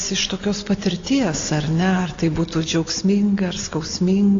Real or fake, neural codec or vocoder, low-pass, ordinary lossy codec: real; none; 7.2 kHz; AAC, 32 kbps